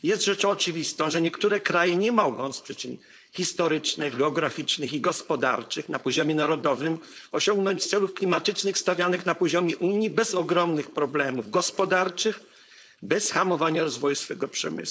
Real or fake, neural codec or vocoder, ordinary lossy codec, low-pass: fake; codec, 16 kHz, 4.8 kbps, FACodec; none; none